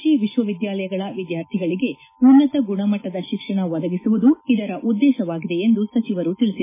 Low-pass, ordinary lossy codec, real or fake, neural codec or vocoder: 3.6 kHz; MP3, 16 kbps; real; none